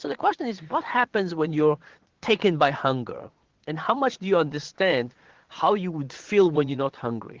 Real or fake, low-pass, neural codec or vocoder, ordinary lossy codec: fake; 7.2 kHz; vocoder, 22.05 kHz, 80 mel bands, Vocos; Opus, 16 kbps